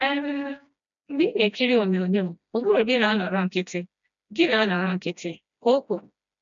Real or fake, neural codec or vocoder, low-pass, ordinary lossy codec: fake; codec, 16 kHz, 1 kbps, FreqCodec, smaller model; 7.2 kHz; none